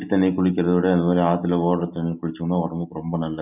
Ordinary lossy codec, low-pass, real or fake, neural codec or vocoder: none; 3.6 kHz; real; none